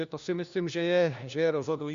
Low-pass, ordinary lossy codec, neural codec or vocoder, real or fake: 7.2 kHz; Opus, 64 kbps; codec, 16 kHz, 1 kbps, FunCodec, trained on LibriTTS, 50 frames a second; fake